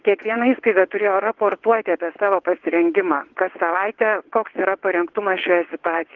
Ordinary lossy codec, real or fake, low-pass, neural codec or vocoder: Opus, 16 kbps; fake; 7.2 kHz; vocoder, 22.05 kHz, 80 mel bands, WaveNeXt